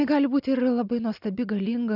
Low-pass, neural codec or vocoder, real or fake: 5.4 kHz; none; real